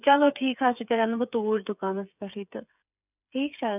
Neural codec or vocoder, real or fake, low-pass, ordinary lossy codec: codec, 16 kHz, 8 kbps, FreqCodec, smaller model; fake; 3.6 kHz; none